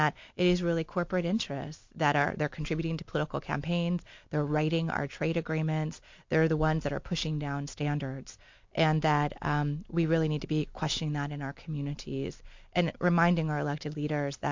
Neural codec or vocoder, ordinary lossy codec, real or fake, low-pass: none; MP3, 48 kbps; real; 7.2 kHz